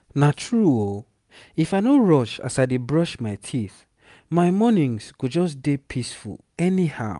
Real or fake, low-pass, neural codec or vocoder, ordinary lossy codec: real; 10.8 kHz; none; none